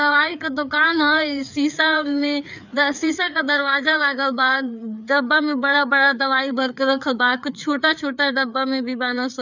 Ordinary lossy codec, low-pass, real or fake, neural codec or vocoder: none; 7.2 kHz; fake; codec, 16 kHz, 4 kbps, FreqCodec, larger model